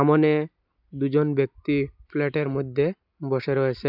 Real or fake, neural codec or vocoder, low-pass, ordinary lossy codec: real; none; 5.4 kHz; none